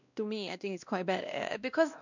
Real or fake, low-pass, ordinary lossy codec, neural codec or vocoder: fake; 7.2 kHz; none; codec, 16 kHz, 1 kbps, X-Codec, WavLM features, trained on Multilingual LibriSpeech